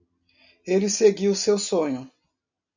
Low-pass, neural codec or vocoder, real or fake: 7.2 kHz; none; real